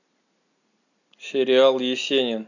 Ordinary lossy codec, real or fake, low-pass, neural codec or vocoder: none; real; 7.2 kHz; none